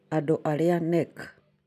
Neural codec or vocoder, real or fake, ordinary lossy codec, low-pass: none; real; none; 14.4 kHz